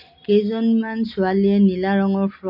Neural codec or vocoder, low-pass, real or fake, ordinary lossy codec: none; 5.4 kHz; real; MP3, 32 kbps